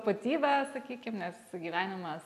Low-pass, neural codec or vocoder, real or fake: 14.4 kHz; none; real